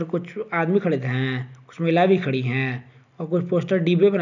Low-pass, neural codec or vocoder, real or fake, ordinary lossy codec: 7.2 kHz; none; real; none